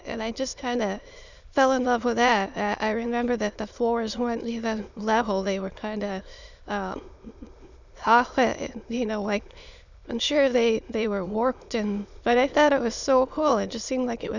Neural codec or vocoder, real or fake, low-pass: autoencoder, 22.05 kHz, a latent of 192 numbers a frame, VITS, trained on many speakers; fake; 7.2 kHz